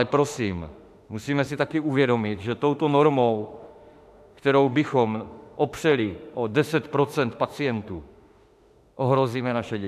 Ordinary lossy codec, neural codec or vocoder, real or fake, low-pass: AAC, 96 kbps; autoencoder, 48 kHz, 32 numbers a frame, DAC-VAE, trained on Japanese speech; fake; 14.4 kHz